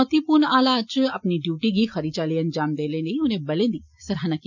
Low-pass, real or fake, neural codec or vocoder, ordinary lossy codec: 7.2 kHz; real; none; none